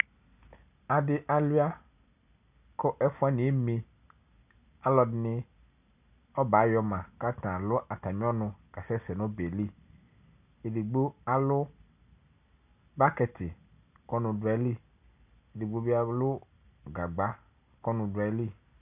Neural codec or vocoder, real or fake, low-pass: none; real; 3.6 kHz